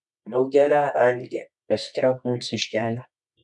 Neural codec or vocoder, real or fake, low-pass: codec, 24 kHz, 0.9 kbps, WavTokenizer, medium music audio release; fake; 10.8 kHz